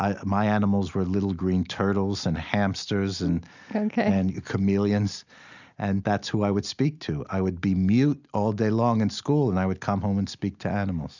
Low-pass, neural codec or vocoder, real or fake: 7.2 kHz; none; real